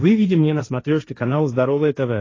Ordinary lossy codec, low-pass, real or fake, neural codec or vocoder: AAC, 32 kbps; 7.2 kHz; fake; codec, 16 kHz, 1.1 kbps, Voila-Tokenizer